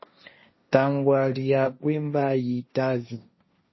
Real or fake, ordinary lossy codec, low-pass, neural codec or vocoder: fake; MP3, 24 kbps; 7.2 kHz; codec, 16 kHz, 1.1 kbps, Voila-Tokenizer